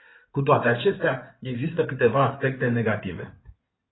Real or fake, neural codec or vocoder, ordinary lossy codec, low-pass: fake; codec, 16 kHz in and 24 kHz out, 2.2 kbps, FireRedTTS-2 codec; AAC, 16 kbps; 7.2 kHz